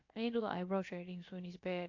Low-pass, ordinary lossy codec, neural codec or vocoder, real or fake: 7.2 kHz; AAC, 48 kbps; codec, 16 kHz, about 1 kbps, DyCAST, with the encoder's durations; fake